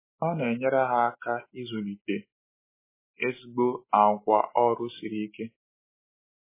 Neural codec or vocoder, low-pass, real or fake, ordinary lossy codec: none; 3.6 kHz; real; MP3, 16 kbps